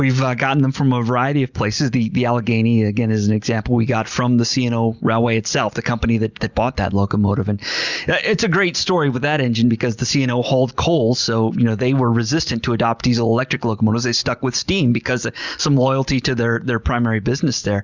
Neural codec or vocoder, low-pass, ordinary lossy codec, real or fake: none; 7.2 kHz; Opus, 64 kbps; real